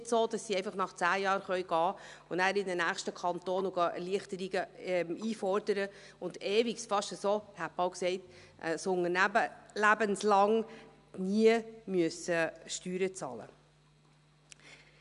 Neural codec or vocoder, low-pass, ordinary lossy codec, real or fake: none; 10.8 kHz; none; real